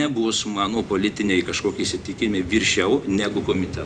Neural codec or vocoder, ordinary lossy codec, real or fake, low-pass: vocoder, 44.1 kHz, 128 mel bands every 512 samples, BigVGAN v2; Opus, 64 kbps; fake; 9.9 kHz